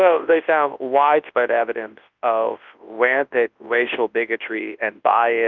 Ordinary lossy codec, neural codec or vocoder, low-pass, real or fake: Opus, 24 kbps; codec, 24 kHz, 0.9 kbps, WavTokenizer, large speech release; 7.2 kHz; fake